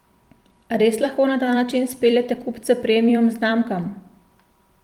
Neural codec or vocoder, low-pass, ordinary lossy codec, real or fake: vocoder, 44.1 kHz, 128 mel bands every 256 samples, BigVGAN v2; 19.8 kHz; Opus, 32 kbps; fake